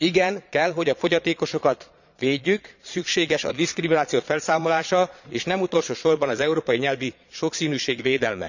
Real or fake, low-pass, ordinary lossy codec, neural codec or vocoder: fake; 7.2 kHz; none; vocoder, 22.05 kHz, 80 mel bands, Vocos